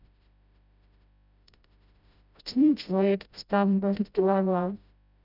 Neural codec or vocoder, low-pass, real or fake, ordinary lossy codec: codec, 16 kHz, 0.5 kbps, FreqCodec, smaller model; 5.4 kHz; fake; none